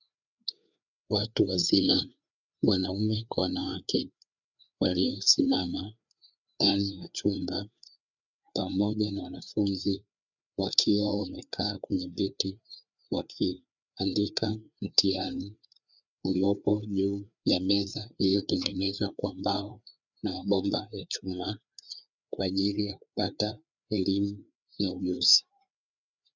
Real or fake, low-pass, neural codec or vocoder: fake; 7.2 kHz; codec, 16 kHz, 4 kbps, FreqCodec, larger model